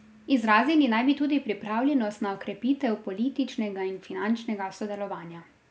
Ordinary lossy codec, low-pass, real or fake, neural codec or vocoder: none; none; real; none